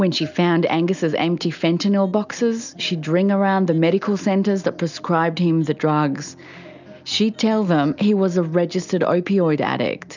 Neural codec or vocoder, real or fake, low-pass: none; real; 7.2 kHz